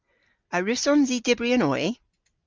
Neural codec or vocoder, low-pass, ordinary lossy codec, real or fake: none; 7.2 kHz; Opus, 32 kbps; real